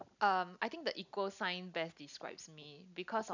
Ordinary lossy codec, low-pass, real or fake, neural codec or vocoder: none; 7.2 kHz; real; none